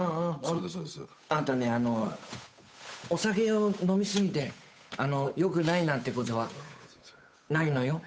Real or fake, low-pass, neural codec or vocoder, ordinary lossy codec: fake; none; codec, 16 kHz, 8 kbps, FunCodec, trained on Chinese and English, 25 frames a second; none